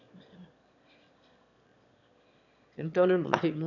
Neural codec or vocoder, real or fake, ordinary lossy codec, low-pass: autoencoder, 22.05 kHz, a latent of 192 numbers a frame, VITS, trained on one speaker; fake; none; 7.2 kHz